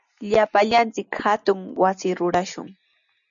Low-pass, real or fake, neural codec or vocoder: 7.2 kHz; real; none